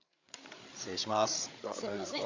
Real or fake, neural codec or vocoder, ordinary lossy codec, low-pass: fake; codec, 16 kHz, 8 kbps, FreqCodec, larger model; Opus, 64 kbps; 7.2 kHz